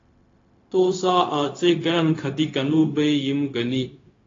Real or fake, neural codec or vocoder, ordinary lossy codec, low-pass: fake; codec, 16 kHz, 0.4 kbps, LongCat-Audio-Codec; AAC, 32 kbps; 7.2 kHz